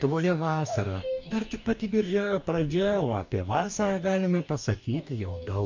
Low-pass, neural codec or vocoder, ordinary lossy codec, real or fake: 7.2 kHz; codec, 44.1 kHz, 2.6 kbps, DAC; MP3, 64 kbps; fake